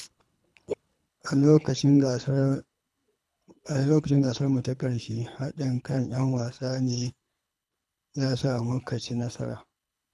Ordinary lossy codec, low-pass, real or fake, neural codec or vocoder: none; none; fake; codec, 24 kHz, 3 kbps, HILCodec